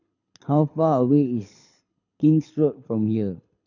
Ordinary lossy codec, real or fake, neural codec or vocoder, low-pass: none; fake; codec, 24 kHz, 6 kbps, HILCodec; 7.2 kHz